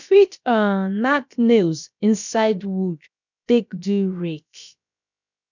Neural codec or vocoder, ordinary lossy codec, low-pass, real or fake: codec, 16 kHz, about 1 kbps, DyCAST, with the encoder's durations; none; 7.2 kHz; fake